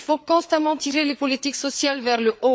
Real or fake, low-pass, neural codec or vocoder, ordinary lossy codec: fake; none; codec, 16 kHz, 4 kbps, FreqCodec, larger model; none